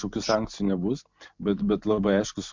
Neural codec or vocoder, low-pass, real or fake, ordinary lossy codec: none; 7.2 kHz; real; MP3, 64 kbps